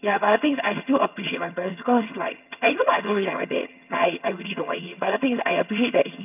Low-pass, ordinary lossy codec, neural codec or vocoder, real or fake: 3.6 kHz; none; vocoder, 22.05 kHz, 80 mel bands, HiFi-GAN; fake